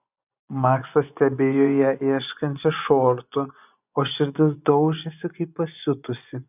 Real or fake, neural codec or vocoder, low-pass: fake; vocoder, 24 kHz, 100 mel bands, Vocos; 3.6 kHz